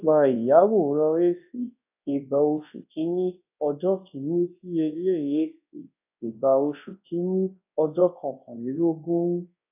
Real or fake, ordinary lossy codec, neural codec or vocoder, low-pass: fake; AAC, 32 kbps; codec, 24 kHz, 0.9 kbps, WavTokenizer, large speech release; 3.6 kHz